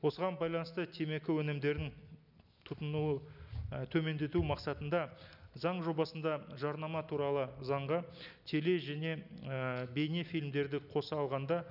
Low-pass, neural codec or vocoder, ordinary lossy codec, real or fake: 5.4 kHz; none; none; real